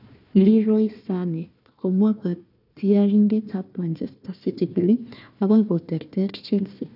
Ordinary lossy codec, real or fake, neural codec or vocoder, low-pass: none; fake; codec, 16 kHz, 1 kbps, FunCodec, trained on Chinese and English, 50 frames a second; 5.4 kHz